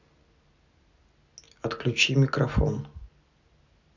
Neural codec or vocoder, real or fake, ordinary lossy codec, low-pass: none; real; none; 7.2 kHz